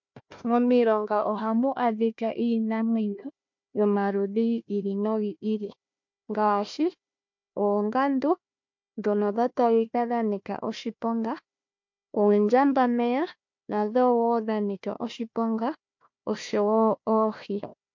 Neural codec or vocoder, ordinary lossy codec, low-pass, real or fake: codec, 16 kHz, 1 kbps, FunCodec, trained on Chinese and English, 50 frames a second; MP3, 48 kbps; 7.2 kHz; fake